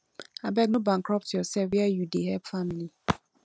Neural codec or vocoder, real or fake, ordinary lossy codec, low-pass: none; real; none; none